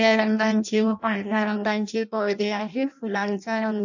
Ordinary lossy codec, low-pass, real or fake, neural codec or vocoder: none; 7.2 kHz; fake; codec, 16 kHz in and 24 kHz out, 0.6 kbps, FireRedTTS-2 codec